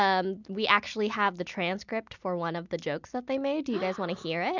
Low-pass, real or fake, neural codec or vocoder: 7.2 kHz; real; none